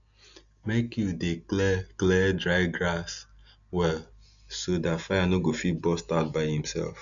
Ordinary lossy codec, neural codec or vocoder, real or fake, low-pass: none; none; real; 7.2 kHz